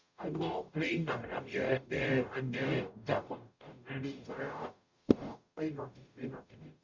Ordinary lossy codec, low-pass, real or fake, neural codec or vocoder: Opus, 64 kbps; 7.2 kHz; fake; codec, 44.1 kHz, 0.9 kbps, DAC